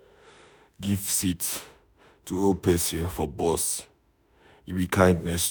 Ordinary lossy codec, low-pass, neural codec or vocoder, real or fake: none; none; autoencoder, 48 kHz, 32 numbers a frame, DAC-VAE, trained on Japanese speech; fake